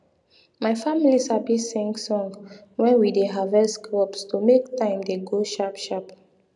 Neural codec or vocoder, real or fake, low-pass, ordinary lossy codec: none; real; 10.8 kHz; none